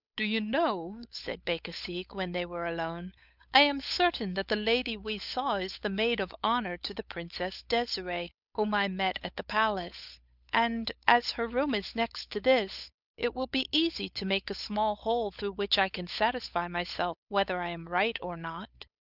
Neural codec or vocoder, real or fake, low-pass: codec, 16 kHz, 8 kbps, FunCodec, trained on Chinese and English, 25 frames a second; fake; 5.4 kHz